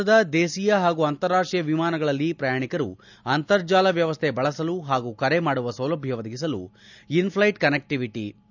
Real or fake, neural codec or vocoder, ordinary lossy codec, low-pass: real; none; none; 7.2 kHz